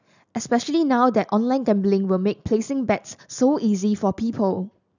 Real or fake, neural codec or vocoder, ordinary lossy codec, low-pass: real; none; none; 7.2 kHz